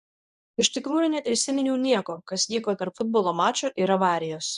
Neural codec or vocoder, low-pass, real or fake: codec, 24 kHz, 0.9 kbps, WavTokenizer, medium speech release version 1; 10.8 kHz; fake